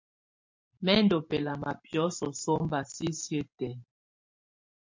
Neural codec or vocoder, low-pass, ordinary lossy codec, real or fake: vocoder, 44.1 kHz, 128 mel bands every 512 samples, BigVGAN v2; 7.2 kHz; MP3, 48 kbps; fake